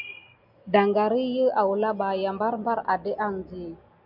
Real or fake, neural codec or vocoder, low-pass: real; none; 5.4 kHz